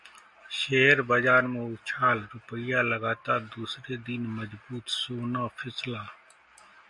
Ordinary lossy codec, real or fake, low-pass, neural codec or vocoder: MP3, 64 kbps; real; 10.8 kHz; none